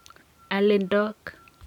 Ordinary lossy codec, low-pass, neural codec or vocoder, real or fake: none; 19.8 kHz; none; real